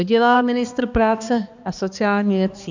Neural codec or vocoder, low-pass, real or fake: codec, 16 kHz, 2 kbps, X-Codec, HuBERT features, trained on balanced general audio; 7.2 kHz; fake